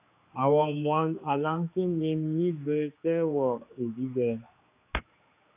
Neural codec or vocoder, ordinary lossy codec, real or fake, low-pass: codec, 16 kHz, 2 kbps, X-Codec, HuBERT features, trained on general audio; AAC, 32 kbps; fake; 3.6 kHz